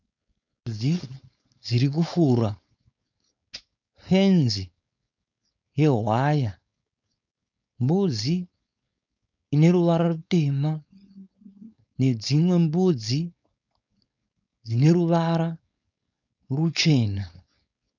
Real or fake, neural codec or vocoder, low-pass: fake; codec, 16 kHz, 4.8 kbps, FACodec; 7.2 kHz